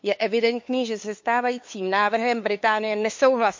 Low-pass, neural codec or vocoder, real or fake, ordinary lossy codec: 7.2 kHz; codec, 16 kHz, 2 kbps, FunCodec, trained on LibriTTS, 25 frames a second; fake; MP3, 48 kbps